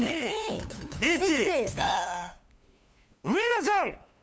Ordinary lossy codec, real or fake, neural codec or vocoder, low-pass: none; fake; codec, 16 kHz, 2 kbps, FunCodec, trained on LibriTTS, 25 frames a second; none